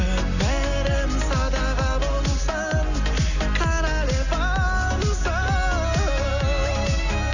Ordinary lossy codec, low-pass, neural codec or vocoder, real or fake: none; 7.2 kHz; none; real